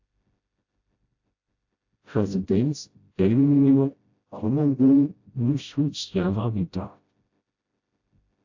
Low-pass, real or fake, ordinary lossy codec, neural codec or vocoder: 7.2 kHz; fake; AAC, 48 kbps; codec, 16 kHz, 0.5 kbps, FreqCodec, smaller model